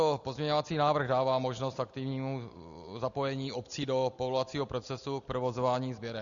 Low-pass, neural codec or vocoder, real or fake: 7.2 kHz; none; real